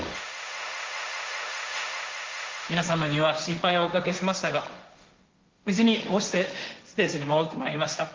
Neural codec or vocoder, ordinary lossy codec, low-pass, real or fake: codec, 16 kHz, 1.1 kbps, Voila-Tokenizer; Opus, 32 kbps; 7.2 kHz; fake